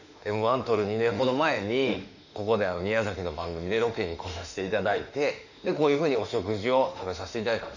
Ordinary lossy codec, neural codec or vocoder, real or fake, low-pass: none; autoencoder, 48 kHz, 32 numbers a frame, DAC-VAE, trained on Japanese speech; fake; 7.2 kHz